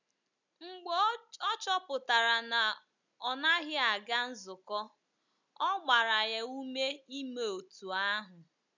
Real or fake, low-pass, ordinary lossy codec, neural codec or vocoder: real; 7.2 kHz; none; none